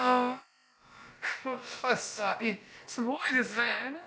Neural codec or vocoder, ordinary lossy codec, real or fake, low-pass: codec, 16 kHz, about 1 kbps, DyCAST, with the encoder's durations; none; fake; none